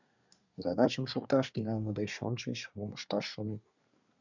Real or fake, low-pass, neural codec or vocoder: fake; 7.2 kHz; codec, 24 kHz, 1 kbps, SNAC